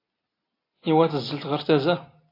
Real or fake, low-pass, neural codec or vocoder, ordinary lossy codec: real; 5.4 kHz; none; AAC, 24 kbps